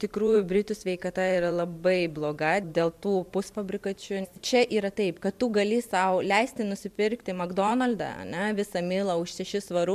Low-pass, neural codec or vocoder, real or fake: 14.4 kHz; vocoder, 44.1 kHz, 128 mel bands every 512 samples, BigVGAN v2; fake